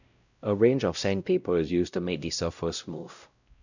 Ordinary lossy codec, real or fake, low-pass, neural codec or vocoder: none; fake; 7.2 kHz; codec, 16 kHz, 0.5 kbps, X-Codec, WavLM features, trained on Multilingual LibriSpeech